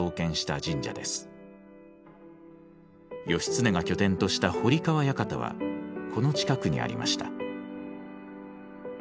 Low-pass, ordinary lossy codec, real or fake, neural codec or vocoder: none; none; real; none